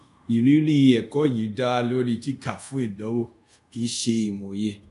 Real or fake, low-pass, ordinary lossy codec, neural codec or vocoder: fake; 10.8 kHz; none; codec, 24 kHz, 0.5 kbps, DualCodec